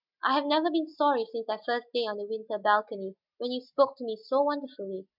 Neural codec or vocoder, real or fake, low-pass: none; real; 5.4 kHz